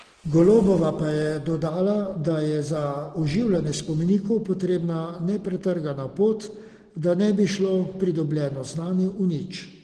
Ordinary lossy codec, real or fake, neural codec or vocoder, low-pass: Opus, 16 kbps; real; none; 9.9 kHz